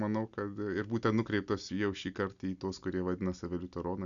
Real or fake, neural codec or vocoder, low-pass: real; none; 7.2 kHz